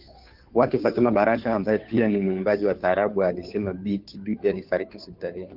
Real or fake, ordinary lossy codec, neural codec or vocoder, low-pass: fake; Opus, 24 kbps; codec, 16 kHz, 2 kbps, FunCodec, trained on Chinese and English, 25 frames a second; 5.4 kHz